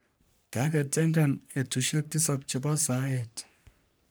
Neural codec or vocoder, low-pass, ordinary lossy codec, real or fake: codec, 44.1 kHz, 3.4 kbps, Pupu-Codec; none; none; fake